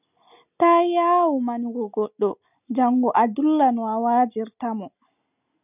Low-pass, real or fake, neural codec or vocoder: 3.6 kHz; real; none